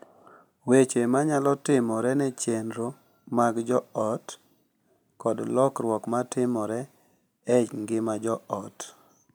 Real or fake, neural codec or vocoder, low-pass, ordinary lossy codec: real; none; none; none